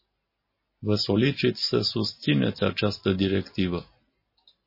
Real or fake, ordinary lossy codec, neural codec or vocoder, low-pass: real; MP3, 24 kbps; none; 5.4 kHz